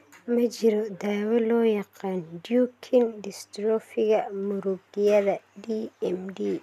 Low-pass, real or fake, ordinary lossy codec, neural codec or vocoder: 14.4 kHz; real; none; none